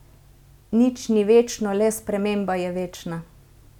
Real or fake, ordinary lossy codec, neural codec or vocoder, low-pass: real; none; none; 19.8 kHz